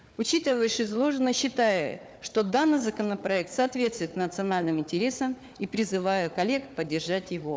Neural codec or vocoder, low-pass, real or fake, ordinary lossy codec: codec, 16 kHz, 4 kbps, FunCodec, trained on Chinese and English, 50 frames a second; none; fake; none